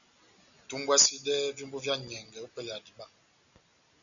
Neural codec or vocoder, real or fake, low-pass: none; real; 7.2 kHz